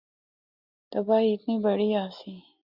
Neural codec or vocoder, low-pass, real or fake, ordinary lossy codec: none; 5.4 kHz; real; Opus, 64 kbps